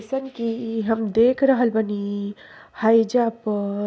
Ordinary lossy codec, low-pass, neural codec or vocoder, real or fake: none; none; none; real